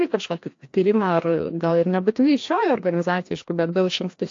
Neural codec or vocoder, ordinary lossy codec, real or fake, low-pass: codec, 16 kHz, 1 kbps, FreqCodec, larger model; AAC, 48 kbps; fake; 7.2 kHz